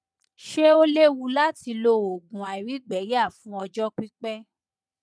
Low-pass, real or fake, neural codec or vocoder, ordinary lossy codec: none; fake; vocoder, 22.05 kHz, 80 mel bands, Vocos; none